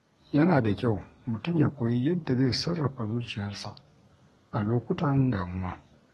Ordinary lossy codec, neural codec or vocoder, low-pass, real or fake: AAC, 32 kbps; codec, 32 kHz, 1.9 kbps, SNAC; 14.4 kHz; fake